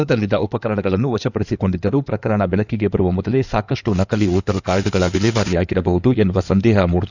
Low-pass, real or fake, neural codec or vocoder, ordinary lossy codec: 7.2 kHz; fake; codec, 16 kHz in and 24 kHz out, 2.2 kbps, FireRedTTS-2 codec; none